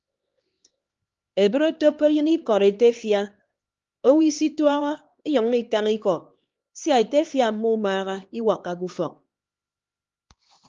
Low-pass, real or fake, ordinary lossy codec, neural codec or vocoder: 7.2 kHz; fake; Opus, 32 kbps; codec, 16 kHz, 2 kbps, X-Codec, HuBERT features, trained on LibriSpeech